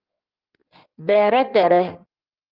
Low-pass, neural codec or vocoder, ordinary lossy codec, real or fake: 5.4 kHz; codec, 16 kHz in and 24 kHz out, 1.1 kbps, FireRedTTS-2 codec; Opus, 32 kbps; fake